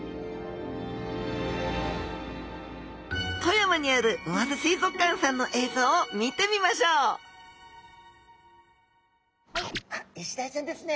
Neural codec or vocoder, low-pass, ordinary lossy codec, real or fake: none; none; none; real